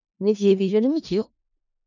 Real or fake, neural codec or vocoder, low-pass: fake; codec, 16 kHz in and 24 kHz out, 0.4 kbps, LongCat-Audio-Codec, four codebook decoder; 7.2 kHz